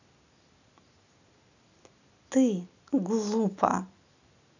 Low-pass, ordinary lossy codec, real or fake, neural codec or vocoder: 7.2 kHz; none; real; none